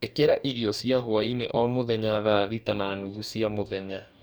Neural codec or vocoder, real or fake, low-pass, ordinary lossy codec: codec, 44.1 kHz, 2.6 kbps, DAC; fake; none; none